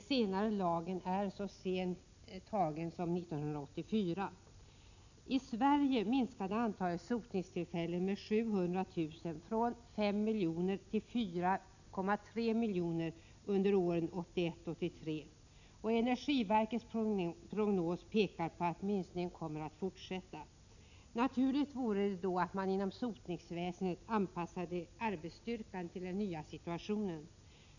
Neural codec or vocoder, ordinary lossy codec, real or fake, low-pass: none; none; real; 7.2 kHz